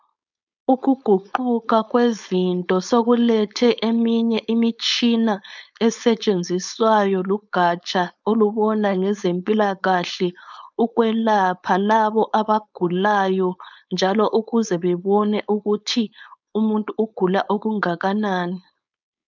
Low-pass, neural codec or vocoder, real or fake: 7.2 kHz; codec, 16 kHz, 4.8 kbps, FACodec; fake